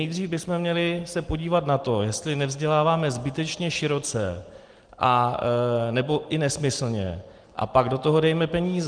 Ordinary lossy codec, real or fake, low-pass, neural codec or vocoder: Opus, 24 kbps; real; 9.9 kHz; none